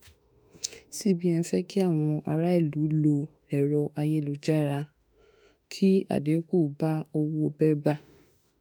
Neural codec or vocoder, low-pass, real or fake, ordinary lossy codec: autoencoder, 48 kHz, 32 numbers a frame, DAC-VAE, trained on Japanese speech; none; fake; none